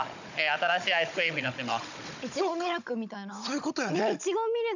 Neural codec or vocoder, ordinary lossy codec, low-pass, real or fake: codec, 16 kHz, 16 kbps, FunCodec, trained on Chinese and English, 50 frames a second; none; 7.2 kHz; fake